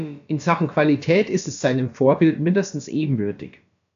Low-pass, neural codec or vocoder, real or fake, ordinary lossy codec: 7.2 kHz; codec, 16 kHz, about 1 kbps, DyCAST, with the encoder's durations; fake; AAC, 64 kbps